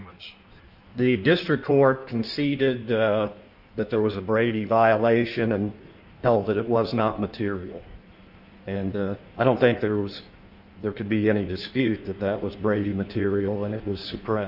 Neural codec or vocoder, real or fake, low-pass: codec, 16 kHz in and 24 kHz out, 1.1 kbps, FireRedTTS-2 codec; fake; 5.4 kHz